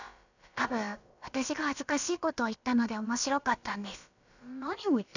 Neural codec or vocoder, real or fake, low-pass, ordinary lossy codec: codec, 16 kHz, about 1 kbps, DyCAST, with the encoder's durations; fake; 7.2 kHz; none